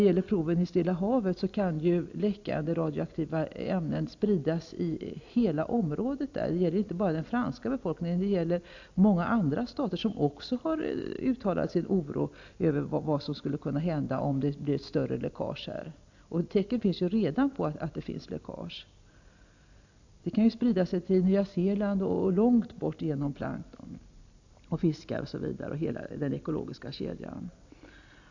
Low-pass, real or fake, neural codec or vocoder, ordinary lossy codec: 7.2 kHz; real; none; AAC, 48 kbps